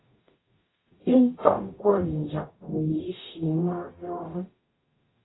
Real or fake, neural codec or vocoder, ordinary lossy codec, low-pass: fake; codec, 44.1 kHz, 0.9 kbps, DAC; AAC, 16 kbps; 7.2 kHz